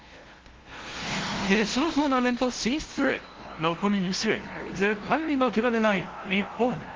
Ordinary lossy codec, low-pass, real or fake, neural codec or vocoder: Opus, 16 kbps; 7.2 kHz; fake; codec, 16 kHz, 0.5 kbps, FunCodec, trained on LibriTTS, 25 frames a second